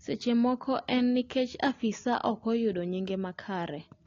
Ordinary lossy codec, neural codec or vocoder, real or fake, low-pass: AAC, 32 kbps; none; real; 7.2 kHz